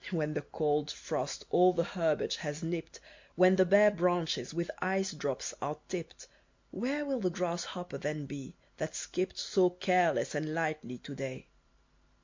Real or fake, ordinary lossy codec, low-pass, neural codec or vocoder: real; MP3, 48 kbps; 7.2 kHz; none